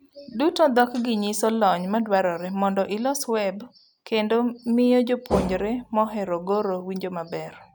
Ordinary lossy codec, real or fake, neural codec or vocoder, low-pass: none; real; none; 19.8 kHz